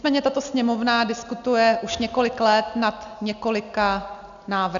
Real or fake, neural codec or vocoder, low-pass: real; none; 7.2 kHz